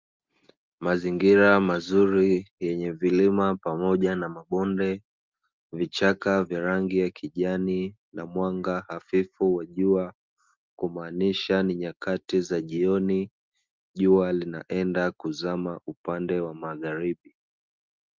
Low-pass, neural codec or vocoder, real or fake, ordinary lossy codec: 7.2 kHz; none; real; Opus, 32 kbps